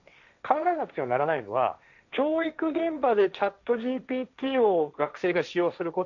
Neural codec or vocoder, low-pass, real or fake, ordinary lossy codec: codec, 16 kHz, 1.1 kbps, Voila-Tokenizer; 7.2 kHz; fake; none